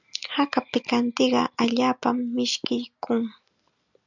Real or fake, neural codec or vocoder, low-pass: real; none; 7.2 kHz